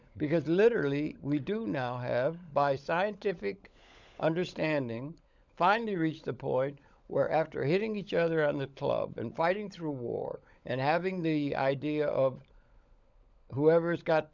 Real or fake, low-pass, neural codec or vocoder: fake; 7.2 kHz; codec, 16 kHz, 16 kbps, FunCodec, trained on LibriTTS, 50 frames a second